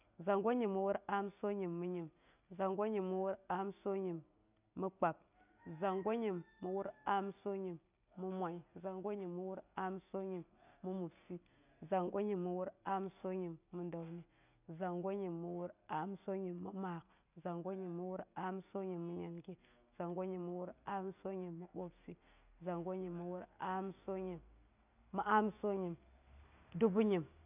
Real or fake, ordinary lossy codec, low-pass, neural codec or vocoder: real; none; 3.6 kHz; none